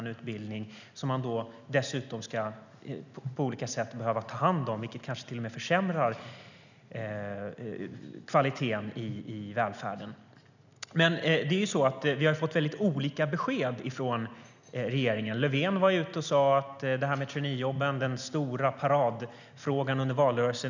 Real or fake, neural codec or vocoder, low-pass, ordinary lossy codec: real; none; 7.2 kHz; none